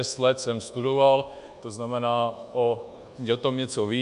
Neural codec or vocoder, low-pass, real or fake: codec, 24 kHz, 1.2 kbps, DualCodec; 10.8 kHz; fake